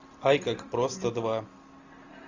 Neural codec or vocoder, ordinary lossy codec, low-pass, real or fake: none; AAC, 48 kbps; 7.2 kHz; real